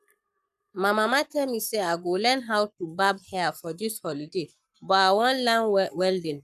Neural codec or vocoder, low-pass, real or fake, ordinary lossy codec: codec, 44.1 kHz, 7.8 kbps, Pupu-Codec; 14.4 kHz; fake; none